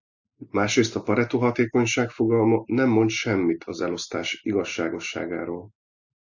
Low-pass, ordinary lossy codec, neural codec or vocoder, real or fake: 7.2 kHz; Opus, 64 kbps; none; real